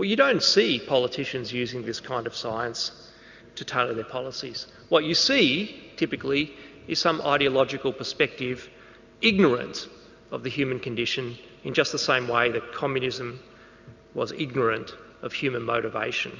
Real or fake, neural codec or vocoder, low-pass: real; none; 7.2 kHz